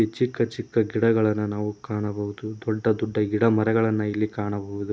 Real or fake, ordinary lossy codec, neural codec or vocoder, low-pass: real; none; none; none